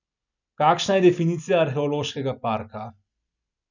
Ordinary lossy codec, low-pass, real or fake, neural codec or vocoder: none; 7.2 kHz; real; none